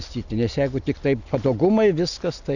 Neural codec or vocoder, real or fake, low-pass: none; real; 7.2 kHz